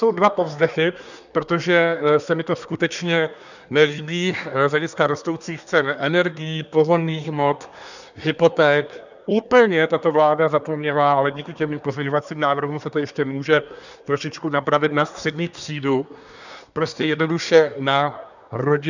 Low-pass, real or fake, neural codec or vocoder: 7.2 kHz; fake; codec, 24 kHz, 1 kbps, SNAC